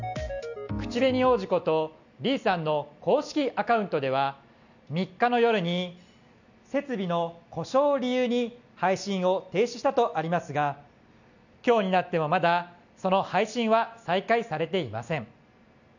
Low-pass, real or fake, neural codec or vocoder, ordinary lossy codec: 7.2 kHz; real; none; none